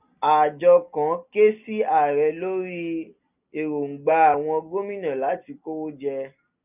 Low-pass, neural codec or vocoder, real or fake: 3.6 kHz; none; real